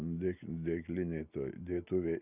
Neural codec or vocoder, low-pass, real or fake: none; 3.6 kHz; real